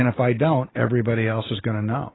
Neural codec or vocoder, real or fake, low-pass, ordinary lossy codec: none; real; 7.2 kHz; AAC, 16 kbps